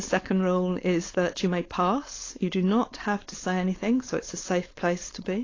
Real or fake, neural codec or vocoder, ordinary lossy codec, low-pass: fake; codec, 16 kHz, 4.8 kbps, FACodec; AAC, 32 kbps; 7.2 kHz